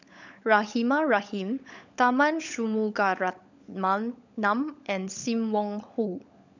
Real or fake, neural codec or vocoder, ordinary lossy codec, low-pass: fake; codec, 16 kHz, 16 kbps, FunCodec, trained on LibriTTS, 50 frames a second; none; 7.2 kHz